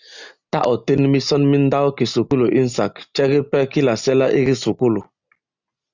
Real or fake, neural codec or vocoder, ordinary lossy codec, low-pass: real; none; Opus, 64 kbps; 7.2 kHz